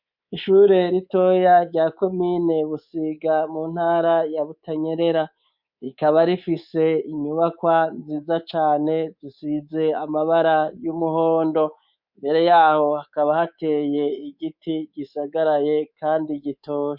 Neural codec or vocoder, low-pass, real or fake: codec, 24 kHz, 3.1 kbps, DualCodec; 5.4 kHz; fake